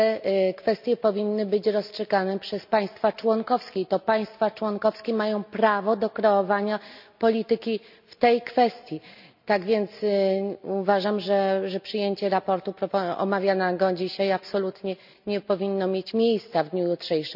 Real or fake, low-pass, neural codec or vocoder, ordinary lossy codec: real; 5.4 kHz; none; none